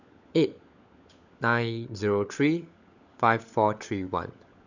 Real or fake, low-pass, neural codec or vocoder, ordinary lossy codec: fake; 7.2 kHz; codec, 16 kHz, 16 kbps, FunCodec, trained on LibriTTS, 50 frames a second; none